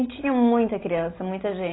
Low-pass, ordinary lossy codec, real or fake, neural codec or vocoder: 7.2 kHz; AAC, 16 kbps; real; none